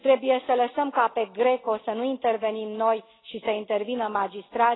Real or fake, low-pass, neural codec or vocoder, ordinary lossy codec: real; 7.2 kHz; none; AAC, 16 kbps